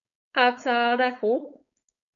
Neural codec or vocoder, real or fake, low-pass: codec, 16 kHz, 4.8 kbps, FACodec; fake; 7.2 kHz